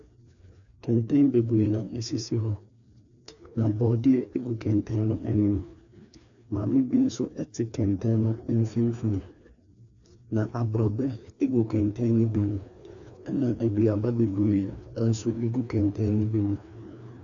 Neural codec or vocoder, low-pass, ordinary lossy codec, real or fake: codec, 16 kHz, 2 kbps, FreqCodec, larger model; 7.2 kHz; AAC, 64 kbps; fake